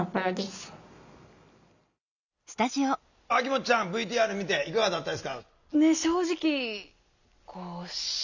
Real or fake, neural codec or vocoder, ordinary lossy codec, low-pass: real; none; none; 7.2 kHz